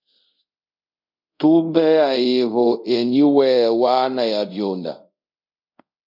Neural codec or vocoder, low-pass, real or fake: codec, 24 kHz, 0.5 kbps, DualCodec; 5.4 kHz; fake